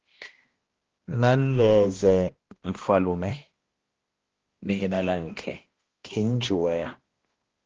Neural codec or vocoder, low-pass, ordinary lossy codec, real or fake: codec, 16 kHz, 1 kbps, X-Codec, HuBERT features, trained on balanced general audio; 7.2 kHz; Opus, 16 kbps; fake